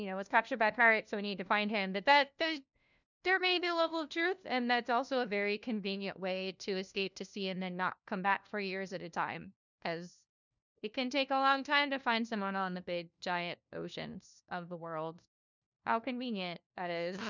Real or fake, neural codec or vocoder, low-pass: fake; codec, 16 kHz, 1 kbps, FunCodec, trained on LibriTTS, 50 frames a second; 7.2 kHz